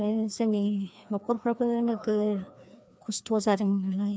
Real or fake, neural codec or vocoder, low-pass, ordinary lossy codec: fake; codec, 16 kHz, 2 kbps, FreqCodec, larger model; none; none